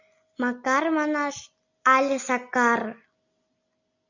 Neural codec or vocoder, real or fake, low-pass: none; real; 7.2 kHz